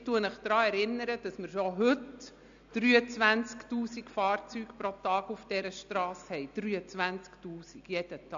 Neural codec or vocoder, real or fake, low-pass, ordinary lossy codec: none; real; 7.2 kHz; AAC, 64 kbps